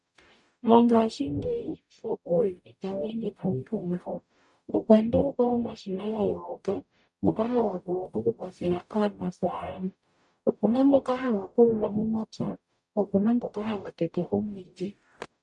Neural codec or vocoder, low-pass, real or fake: codec, 44.1 kHz, 0.9 kbps, DAC; 10.8 kHz; fake